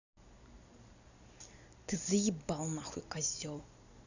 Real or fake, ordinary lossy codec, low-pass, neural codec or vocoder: fake; none; 7.2 kHz; vocoder, 44.1 kHz, 128 mel bands every 256 samples, BigVGAN v2